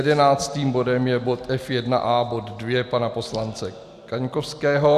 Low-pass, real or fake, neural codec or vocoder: 14.4 kHz; real; none